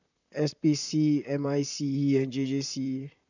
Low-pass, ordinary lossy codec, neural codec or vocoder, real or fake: 7.2 kHz; none; vocoder, 44.1 kHz, 128 mel bands, Pupu-Vocoder; fake